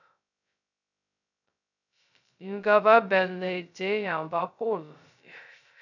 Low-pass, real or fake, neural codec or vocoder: 7.2 kHz; fake; codec, 16 kHz, 0.2 kbps, FocalCodec